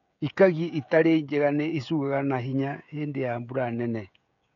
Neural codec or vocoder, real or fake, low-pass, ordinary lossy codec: codec, 16 kHz, 16 kbps, FreqCodec, smaller model; fake; 7.2 kHz; none